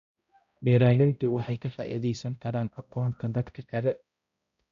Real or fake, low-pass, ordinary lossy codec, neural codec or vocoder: fake; 7.2 kHz; none; codec, 16 kHz, 0.5 kbps, X-Codec, HuBERT features, trained on balanced general audio